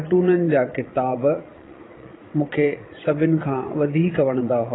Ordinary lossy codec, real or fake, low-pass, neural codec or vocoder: AAC, 16 kbps; fake; 7.2 kHz; vocoder, 22.05 kHz, 80 mel bands, Vocos